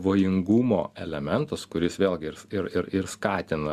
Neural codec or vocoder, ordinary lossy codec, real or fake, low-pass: none; Opus, 64 kbps; real; 14.4 kHz